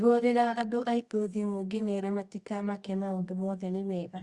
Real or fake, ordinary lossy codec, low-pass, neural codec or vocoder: fake; Opus, 64 kbps; 10.8 kHz; codec, 24 kHz, 0.9 kbps, WavTokenizer, medium music audio release